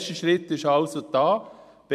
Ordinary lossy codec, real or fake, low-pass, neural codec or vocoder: none; fake; 14.4 kHz; vocoder, 44.1 kHz, 128 mel bands every 256 samples, BigVGAN v2